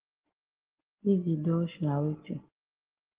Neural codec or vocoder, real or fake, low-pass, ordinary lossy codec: none; real; 3.6 kHz; Opus, 16 kbps